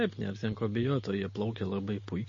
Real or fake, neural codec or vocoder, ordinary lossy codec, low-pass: real; none; MP3, 32 kbps; 7.2 kHz